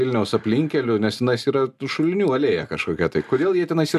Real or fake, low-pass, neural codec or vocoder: real; 14.4 kHz; none